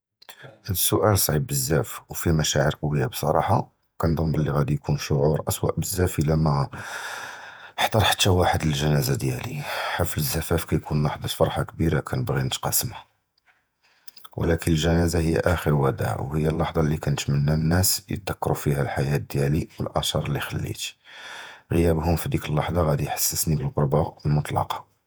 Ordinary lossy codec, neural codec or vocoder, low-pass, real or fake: none; vocoder, 48 kHz, 128 mel bands, Vocos; none; fake